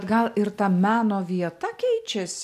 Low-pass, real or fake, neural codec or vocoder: 14.4 kHz; real; none